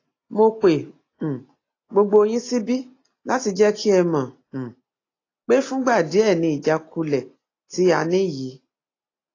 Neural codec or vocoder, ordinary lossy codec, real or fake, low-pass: none; AAC, 32 kbps; real; 7.2 kHz